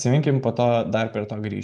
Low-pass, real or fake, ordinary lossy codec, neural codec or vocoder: 9.9 kHz; real; MP3, 96 kbps; none